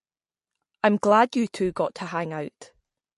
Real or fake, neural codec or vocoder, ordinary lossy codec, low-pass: real; none; MP3, 48 kbps; 14.4 kHz